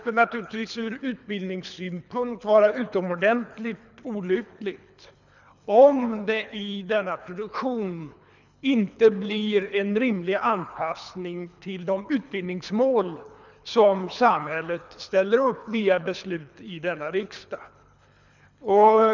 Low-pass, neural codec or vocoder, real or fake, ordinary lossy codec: 7.2 kHz; codec, 24 kHz, 3 kbps, HILCodec; fake; none